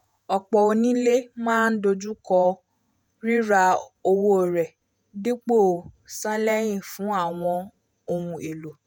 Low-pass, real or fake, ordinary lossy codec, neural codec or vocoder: 19.8 kHz; fake; none; vocoder, 48 kHz, 128 mel bands, Vocos